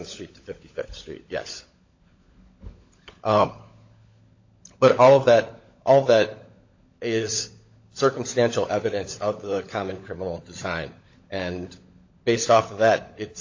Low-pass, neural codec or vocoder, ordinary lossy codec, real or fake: 7.2 kHz; codec, 16 kHz, 16 kbps, FunCodec, trained on LibriTTS, 50 frames a second; AAC, 48 kbps; fake